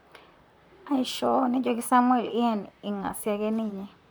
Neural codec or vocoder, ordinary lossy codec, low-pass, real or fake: vocoder, 44.1 kHz, 128 mel bands, Pupu-Vocoder; none; none; fake